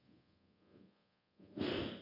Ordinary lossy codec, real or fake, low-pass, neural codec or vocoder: MP3, 32 kbps; fake; 5.4 kHz; codec, 24 kHz, 0.5 kbps, DualCodec